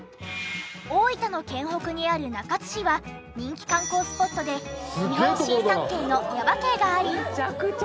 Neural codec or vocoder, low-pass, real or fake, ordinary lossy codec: none; none; real; none